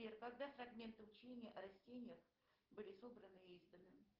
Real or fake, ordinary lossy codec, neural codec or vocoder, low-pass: real; Opus, 16 kbps; none; 5.4 kHz